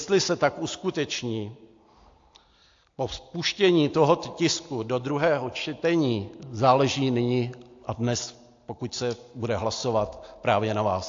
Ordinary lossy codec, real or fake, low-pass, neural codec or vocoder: AAC, 48 kbps; real; 7.2 kHz; none